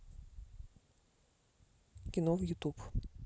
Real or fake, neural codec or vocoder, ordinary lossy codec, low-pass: real; none; none; none